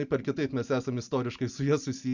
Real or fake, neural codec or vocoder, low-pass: real; none; 7.2 kHz